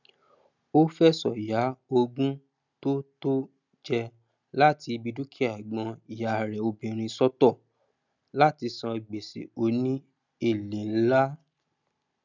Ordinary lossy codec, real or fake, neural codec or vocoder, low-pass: none; real; none; 7.2 kHz